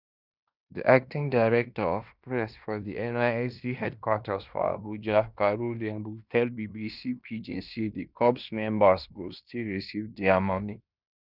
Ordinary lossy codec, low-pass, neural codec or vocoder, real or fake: none; 5.4 kHz; codec, 16 kHz in and 24 kHz out, 0.9 kbps, LongCat-Audio-Codec, fine tuned four codebook decoder; fake